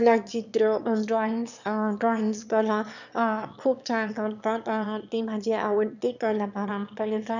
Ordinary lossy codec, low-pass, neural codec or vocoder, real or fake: none; 7.2 kHz; autoencoder, 22.05 kHz, a latent of 192 numbers a frame, VITS, trained on one speaker; fake